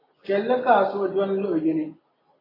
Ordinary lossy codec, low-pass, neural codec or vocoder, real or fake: AAC, 24 kbps; 5.4 kHz; none; real